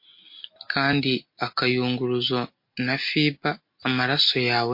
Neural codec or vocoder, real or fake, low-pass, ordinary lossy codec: none; real; 5.4 kHz; MP3, 32 kbps